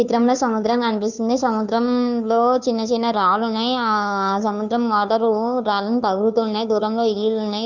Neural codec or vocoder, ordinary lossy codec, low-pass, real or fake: codec, 16 kHz, 2 kbps, FunCodec, trained on Chinese and English, 25 frames a second; none; 7.2 kHz; fake